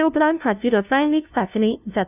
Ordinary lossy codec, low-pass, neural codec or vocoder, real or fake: none; 3.6 kHz; codec, 16 kHz, 0.5 kbps, FunCodec, trained on LibriTTS, 25 frames a second; fake